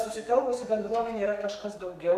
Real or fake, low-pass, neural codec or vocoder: fake; 14.4 kHz; codec, 32 kHz, 1.9 kbps, SNAC